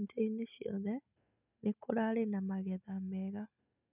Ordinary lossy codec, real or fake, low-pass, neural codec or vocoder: none; real; 3.6 kHz; none